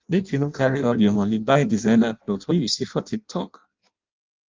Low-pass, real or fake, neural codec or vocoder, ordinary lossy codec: 7.2 kHz; fake; codec, 16 kHz in and 24 kHz out, 0.6 kbps, FireRedTTS-2 codec; Opus, 24 kbps